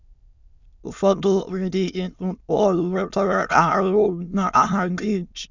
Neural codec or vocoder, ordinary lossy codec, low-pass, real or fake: autoencoder, 22.05 kHz, a latent of 192 numbers a frame, VITS, trained on many speakers; none; 7.2 kHz; fake